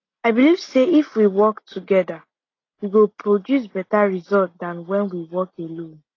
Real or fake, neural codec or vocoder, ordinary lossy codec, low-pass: real; none; AAC, 32 kbps; 7.2 kHz